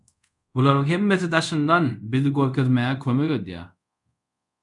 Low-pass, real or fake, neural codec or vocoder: 10.8 kHz; fake; codec, 24 kHz, 0.5 kbps, DualCodec